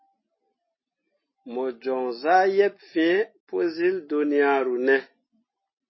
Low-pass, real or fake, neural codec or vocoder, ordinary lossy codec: 7.2 kHz; real; none; MP3, 24 kbps